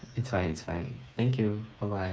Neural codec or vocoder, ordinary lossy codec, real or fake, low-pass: codec, 16 kHz, 4 kbps, FreqCodec, smaller model; none; fake; none